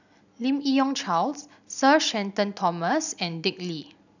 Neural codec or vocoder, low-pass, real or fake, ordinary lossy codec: none; 7.2 kHz; real; none